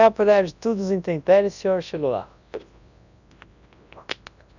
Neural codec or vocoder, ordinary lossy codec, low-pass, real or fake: codec, 24 kHz, 0.9 kbps, WavTokenizer, large speech release; none; 7.2 kHz; fake